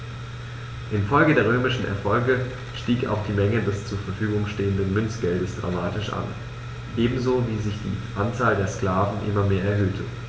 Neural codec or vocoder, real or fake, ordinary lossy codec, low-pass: none; real; none; none